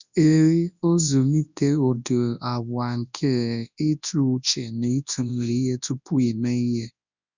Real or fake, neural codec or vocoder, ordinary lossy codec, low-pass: fake; codec, 24 kHz, 0.9 kbps, WavTokenizer, large speech release; none; 7.2 kHz